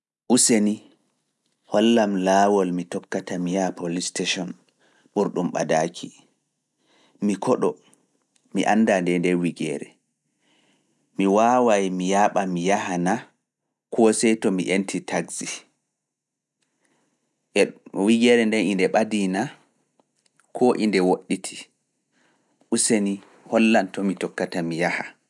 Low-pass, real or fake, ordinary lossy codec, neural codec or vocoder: none; real; none; none